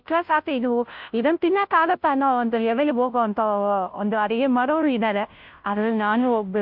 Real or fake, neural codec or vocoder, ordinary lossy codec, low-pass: fake; codec, 16 kHz, 0.5 kbps, FunCodec, trained on Chinese and English, 25 frames a second; MP3, 48 kbps; 5.4 kHz